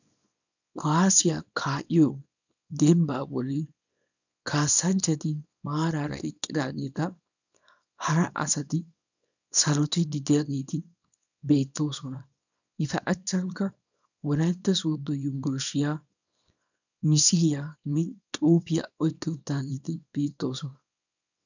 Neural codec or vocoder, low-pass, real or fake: codec, 24 kHz, 0.9 kbps, WavTokenizer, small release; 7.2 kHz; fake